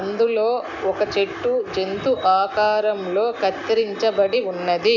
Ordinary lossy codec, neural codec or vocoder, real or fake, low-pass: none; none; real; 7.2 kHz